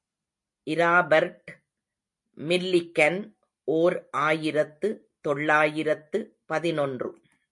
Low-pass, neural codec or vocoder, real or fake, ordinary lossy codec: 10.8 kHz; none; real; MP3, 48 kbps